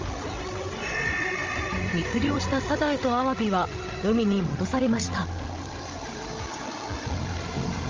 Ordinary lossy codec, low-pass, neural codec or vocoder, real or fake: Opus, 32 kbps; 7.2 kHz; codec, 16 kHz, 16 kbps, FreqCodec, larger model; fake